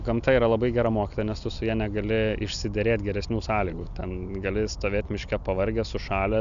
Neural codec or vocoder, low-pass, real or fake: none; 7.2 kHz; real